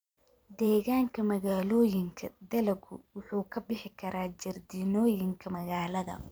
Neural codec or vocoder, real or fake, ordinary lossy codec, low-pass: vocoder, 44.1 kHz, 128 mel bands every 512 samples, BigVGAN v2; fake; none; none